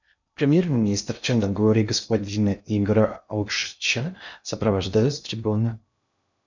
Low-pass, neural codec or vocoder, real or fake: 7.2 kHz; codec, 16 kHz in and 24 kHz out, 0.6 kbps, FocalCodec, streaming, 2048 codes; fake